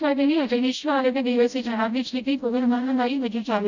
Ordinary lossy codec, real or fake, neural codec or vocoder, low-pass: none; fake; codec, 16 kHz, 0.5 kbps, FreqCodec, smaller model; 7.2 kHz